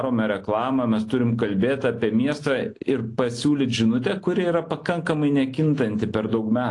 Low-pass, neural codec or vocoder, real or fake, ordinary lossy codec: 10.8 kHz; none; real; AAC, 48 kbps